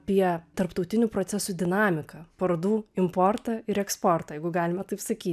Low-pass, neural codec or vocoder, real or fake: 14.4 kHz; none; real